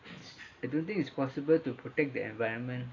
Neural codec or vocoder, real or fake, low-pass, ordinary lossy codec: none; real; 7.2 kHz; AAC, 32 kbps